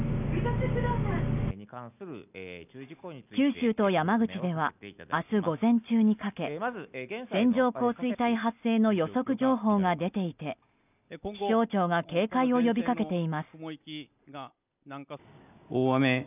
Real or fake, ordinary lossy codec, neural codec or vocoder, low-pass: real; none; none; 3.6 kHz